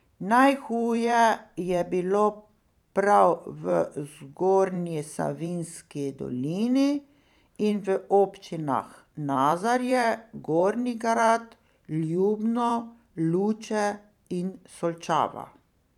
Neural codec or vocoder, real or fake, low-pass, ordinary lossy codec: vocoder, 44.1 kHz, 128 mel bands every 512 samples, BigVGAN v2; fake; 19.8 kHz; none